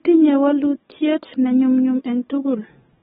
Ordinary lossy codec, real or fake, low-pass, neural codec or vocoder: AAC, 16 kbps; fake; 19.8 kHz; codec, 44.1 kHz, 7.8 kbps, DAC